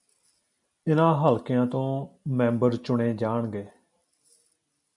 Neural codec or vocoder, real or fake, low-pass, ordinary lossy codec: none; real; 10.8 kHz; MP3, 96 kbps